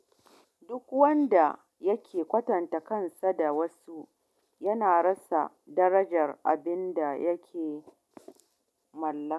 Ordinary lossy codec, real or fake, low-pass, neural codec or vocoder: none; real; none; none